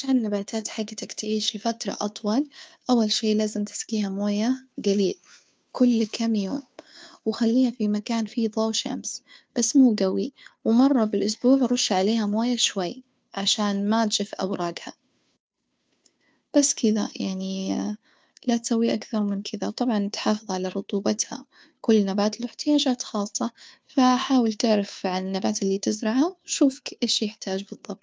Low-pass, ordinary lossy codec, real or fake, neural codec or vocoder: none; none; fake; codec, 16 kHz, 2 kbps, FunCodec, trained on Chinese and English, 25 frames a second